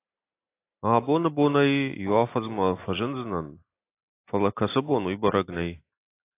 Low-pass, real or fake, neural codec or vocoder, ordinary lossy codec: 3.6 kHz; real; none; AAC, 24 kbps